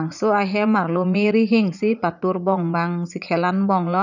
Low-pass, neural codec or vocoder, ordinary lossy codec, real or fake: 7.2 kHz; vocoder, 44.1 kHz, 80 mel bands, Vocos; none; fake